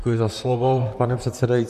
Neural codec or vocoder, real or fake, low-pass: vocoder, 44.1 kHz, 128 mel bands, Pupu-Vocoder; fake; 14.4 kHz